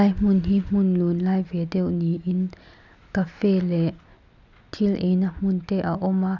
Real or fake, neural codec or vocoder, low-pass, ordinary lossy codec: real; none; 7.2 kHz; none